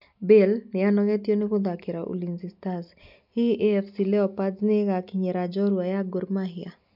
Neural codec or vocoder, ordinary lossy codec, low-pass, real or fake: none; none; 5.4 kHz; real